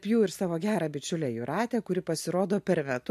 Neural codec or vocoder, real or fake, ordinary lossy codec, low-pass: none; real; MP3, 64 kbps; 14.4 kHz